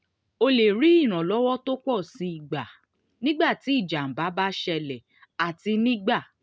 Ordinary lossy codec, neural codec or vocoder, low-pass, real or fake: none; none; none; real